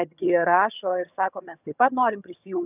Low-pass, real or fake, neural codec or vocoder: 3.6 kHz; fake; vocoder, 22.05 kHz, 80 mel bands, HiFi-GAN